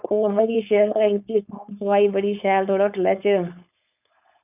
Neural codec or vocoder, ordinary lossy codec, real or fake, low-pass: codec, 16 kHz, 4.8 kbps, FACodec; none; fake; 3.6 kHz